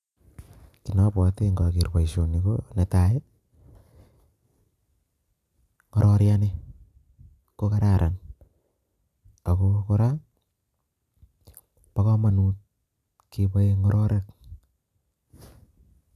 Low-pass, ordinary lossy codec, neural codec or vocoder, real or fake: 14.4 kHz; none; none; real